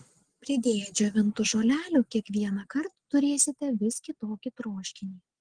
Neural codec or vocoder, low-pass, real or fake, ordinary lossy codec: none; 9.9 kHz; real; Opus, 16 kbps